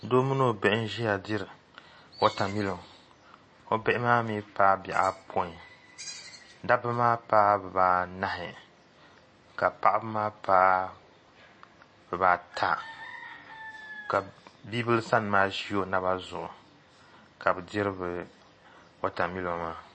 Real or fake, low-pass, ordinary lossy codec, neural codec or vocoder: real; 9.9 kHz; MP3, 32 kbps; none